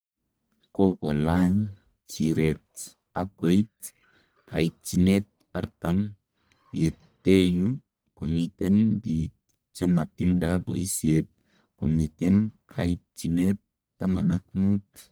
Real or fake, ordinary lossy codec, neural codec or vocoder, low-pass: fake; none; codec, 44.1 kHz, 1.7 kbps, Pupu-Codec; none